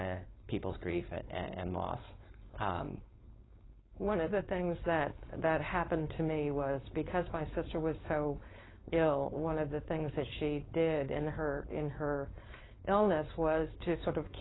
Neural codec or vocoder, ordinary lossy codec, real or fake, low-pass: codec, 16 kHz, 4.8 kbps, FACodec; AAC, 16 kbps; fake; 7.2 kHz